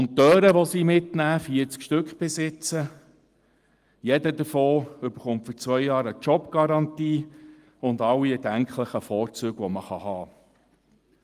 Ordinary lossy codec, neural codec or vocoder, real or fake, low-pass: Opus, 32 kbps; none; real; 14.4 kHz